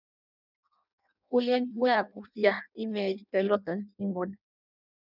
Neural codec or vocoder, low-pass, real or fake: codec, 16 kHz in and 24 kHz out, 0.6 kbps, FireRedTTS-2 codec; 5.4 kHz; fake